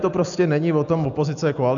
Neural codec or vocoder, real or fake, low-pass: none; real; 7.2 kHz